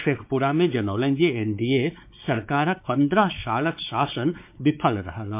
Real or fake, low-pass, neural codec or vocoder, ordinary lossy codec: fake; 3.6 kHz; codec, 16 kHz, 4 kbps, X-Codec, WavLM features, trained on Multilingual LibriSpeech; MP3, 32 kbps